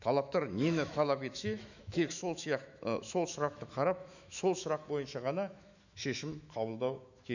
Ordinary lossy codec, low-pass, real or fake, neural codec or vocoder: none; 7.2 kHz; fake; autoencoder, 48 kHz, 128 numbers a frame, DAC-VAE, trained on Japanese speech